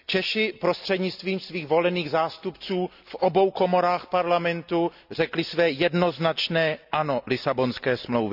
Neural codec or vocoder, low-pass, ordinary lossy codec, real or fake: none; 5.4 kHz; none; real